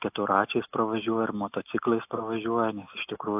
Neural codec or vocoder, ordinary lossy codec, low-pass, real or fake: none; AAC, 32 kbps; 3.6 kHz; real